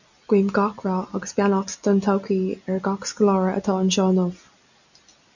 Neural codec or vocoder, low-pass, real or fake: none; 7.2 kHz; real